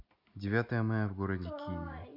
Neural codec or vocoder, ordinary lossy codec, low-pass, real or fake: none; none; 5.4 kHz; real